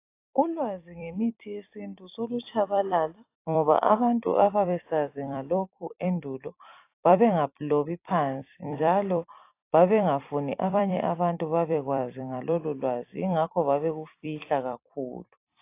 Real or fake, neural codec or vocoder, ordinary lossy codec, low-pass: fake; vocoder, 44.1 kHz, 128 mel bands every 256 samples, BigVGAN v2; AAC, 24 kbps; 3.6 kHz